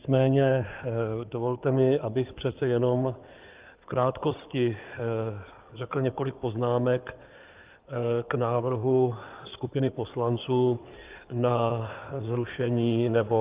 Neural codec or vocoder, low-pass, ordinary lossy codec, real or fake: codec, 16 kHz in and 24 kHz out, 2.2 kbps, FireRedTTS-2 codec; 3.6 kHz; Opus, 24 kbps; fake